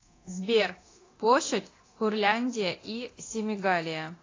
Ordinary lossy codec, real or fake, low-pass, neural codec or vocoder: AAC, 32 kbps; fake; 7.2 kHz; codec, 24 kHz, 0.9 kbps, DualCodec